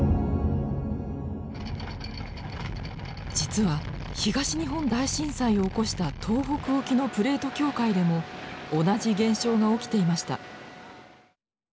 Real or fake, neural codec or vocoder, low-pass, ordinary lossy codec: real; none; none; none